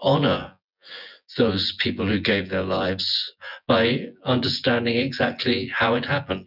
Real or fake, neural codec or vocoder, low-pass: fake; vocoder, 24 kHz, 100 mel bands, Vocos; 5.4 kHz